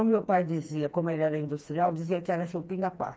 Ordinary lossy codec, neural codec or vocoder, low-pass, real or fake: none; codec, 16 kHz, 2 kbps, FreqCodec, smaller model; none; fake